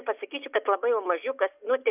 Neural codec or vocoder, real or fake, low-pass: none; real; 3.6 kHz